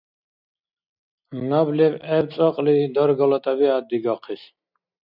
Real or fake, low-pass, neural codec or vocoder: real; 5.4 kHz; none